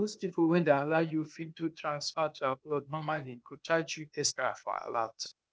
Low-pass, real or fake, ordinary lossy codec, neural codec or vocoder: none; fake; none; codec, 16 kHz, 0.8 kbps, ZipCodec